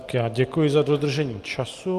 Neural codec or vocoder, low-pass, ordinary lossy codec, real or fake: none; 14.4 kHz; Opus, 32 kbps; real